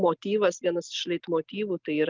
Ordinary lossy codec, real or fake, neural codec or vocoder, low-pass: Opus, 32 kbps; real; none; 7.2 kHz